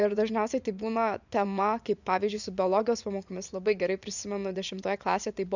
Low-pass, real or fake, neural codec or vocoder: 7.2 kHz; fake; vocoder, 24 kHz, 100 mel bands, Vocos